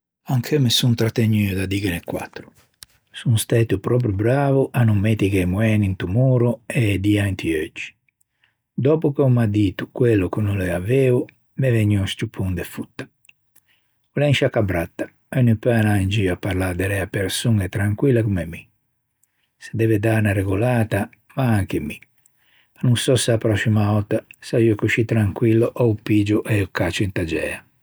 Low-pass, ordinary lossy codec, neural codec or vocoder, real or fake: none; none; none; real